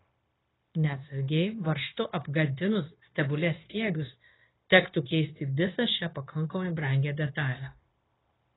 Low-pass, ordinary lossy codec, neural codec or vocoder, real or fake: 7.2 kHz; AAC, 16 kbps; codec, 16 kHz, 0.9 kbps, LongCat-Audio-Codec; fake